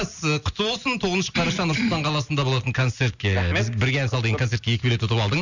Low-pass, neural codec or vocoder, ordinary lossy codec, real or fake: 7.2 kHz; none; none; real